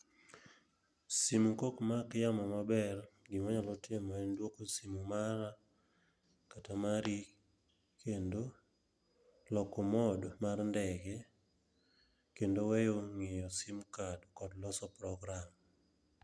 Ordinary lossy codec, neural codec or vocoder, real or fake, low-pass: none; none; real; none